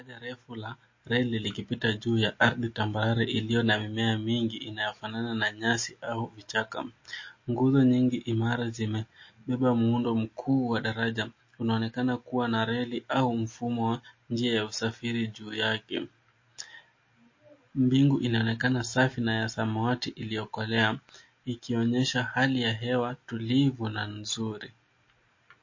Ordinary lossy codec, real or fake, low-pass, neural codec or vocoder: MP3, 32 kbps; real; 7.2 kHz; none